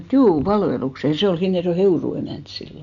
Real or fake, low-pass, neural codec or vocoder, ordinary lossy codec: real; 7.2 kHz; none; none